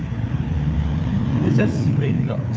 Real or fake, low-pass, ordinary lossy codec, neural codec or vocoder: fake; none; none; codec, 16 kHz, 4 kbps, FreqCodec, larger model